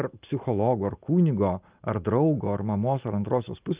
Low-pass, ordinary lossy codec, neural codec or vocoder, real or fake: 3.6 kHz; Opus, 32 kbps; none; real